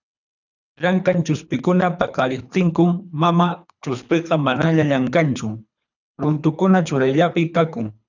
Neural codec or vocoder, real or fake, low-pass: codec, 24 kHz, 3 kbps, HILCodec; fake; 7.2 kHz